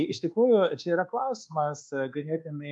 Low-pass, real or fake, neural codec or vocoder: 10.8 kHz; fake; codec, 24 kHz, 1.2 kbps, DualCodec